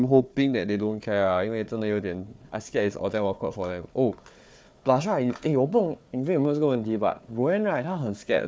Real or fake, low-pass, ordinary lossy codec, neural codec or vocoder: fake; none; none; codec, 16 kHz, 4 kbps, FunCodec, trained on Chinese and English, 50 frames a second